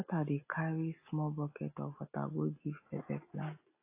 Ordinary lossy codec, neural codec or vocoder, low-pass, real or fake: AAC, 32 kbps; none; 3.6 kHz; real